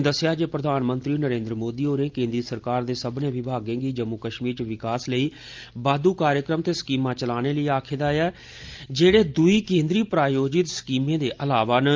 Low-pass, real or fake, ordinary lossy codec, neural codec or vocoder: 7.2 kHz; real; Opus, 32 kbps; none